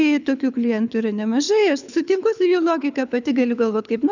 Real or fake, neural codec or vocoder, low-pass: fake; codec, 24 kHz, 6 kbps, HILCodec; 7.2 kHz